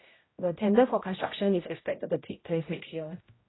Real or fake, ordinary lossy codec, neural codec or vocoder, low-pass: fake; AAC, 16 kbps; codec, 16 kHz, 0.5 kbps, X-Codec, HuBERT features, trained on general audio; 7.2 kHz